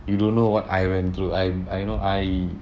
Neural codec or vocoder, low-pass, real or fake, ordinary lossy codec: codec, 16 kHz, 6 kbps, DAC; none; fake; none